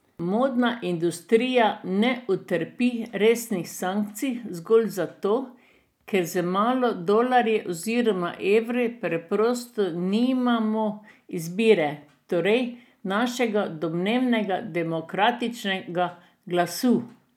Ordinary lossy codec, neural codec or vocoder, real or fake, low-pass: none; none; real; 19.8 kHz